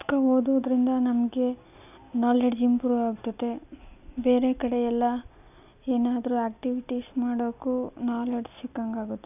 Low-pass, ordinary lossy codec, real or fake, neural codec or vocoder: 3.6 kHz; none; real; none